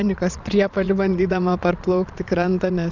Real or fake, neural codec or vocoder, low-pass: fake; vocoder, 22.05 kHz, 80 mel bands, Vocos; 7.2 kHz